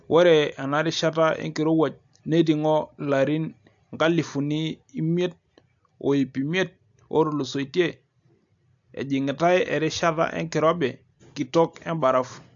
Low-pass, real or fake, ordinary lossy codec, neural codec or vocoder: 7.2 kHz; real; AAC, 64 kbps; none